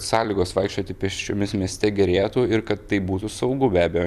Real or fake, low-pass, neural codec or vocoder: fake; 14.4 kHz; vocoder, 44.1 kHz, 128 mel bands every 256 samples, BigVGAN v2